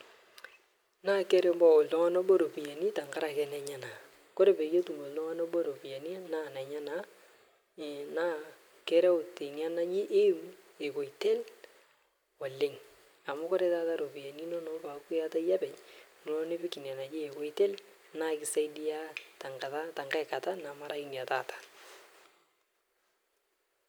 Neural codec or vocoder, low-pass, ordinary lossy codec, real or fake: none; none; none; real